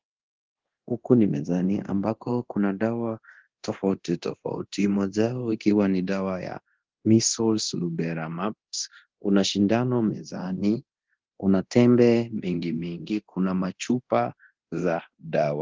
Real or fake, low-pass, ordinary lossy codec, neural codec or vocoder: fake; 7.2 kHz; Opus, 16 kbps; codec, 24 kHz, 0.9 kbps, DualCodec